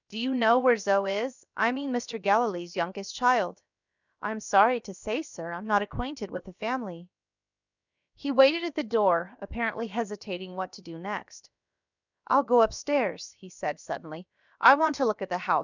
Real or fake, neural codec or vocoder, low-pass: fake; codec, 16 kHz, about 1 kbps, DyCAST, with the encoder's durations; 7.2 kHz